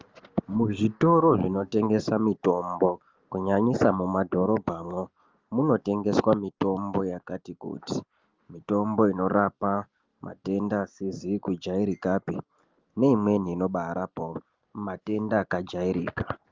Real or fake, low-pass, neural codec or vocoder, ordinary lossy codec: real; 7.2 kHz; none; Opus, 32 kbps